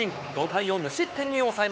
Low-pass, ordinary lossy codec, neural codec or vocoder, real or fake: none; none; codec, 16 kHz, 4 kbps, X-Codec, HuBERT features, trained on LibriSpeech; fake